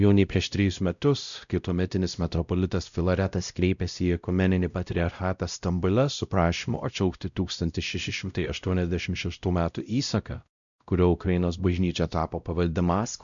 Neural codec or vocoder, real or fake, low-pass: codec, 16 kHz, 0.5 kbps, X-Codec, WavLM features, trained on Multilingual LibriSpeech; fake; 7.2 kHz